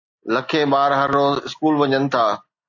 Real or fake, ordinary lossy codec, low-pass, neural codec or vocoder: real; AAC, 48 kbps; 7.2 kHz; none